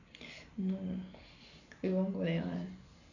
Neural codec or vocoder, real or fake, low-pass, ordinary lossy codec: none; real; 7.2 kHz; none